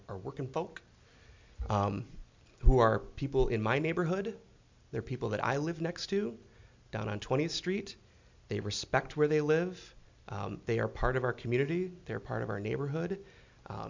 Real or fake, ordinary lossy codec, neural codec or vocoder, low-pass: real; MP3, 64 kbps; none; 7.2 kHz